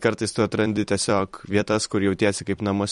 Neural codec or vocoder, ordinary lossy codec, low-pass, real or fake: vocoder, 44.1 kHz, 128 mel bands every 256 samples, BigVGAN v2; MP3, 48 kbps; 19.8 kHz; fake